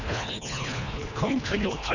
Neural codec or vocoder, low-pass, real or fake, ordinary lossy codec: codec, 24 kHz, 1.5 kbps, HILCodec; 7.2 kHz; fake; none